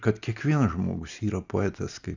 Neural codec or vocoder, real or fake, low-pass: none; real; 7.2 kHz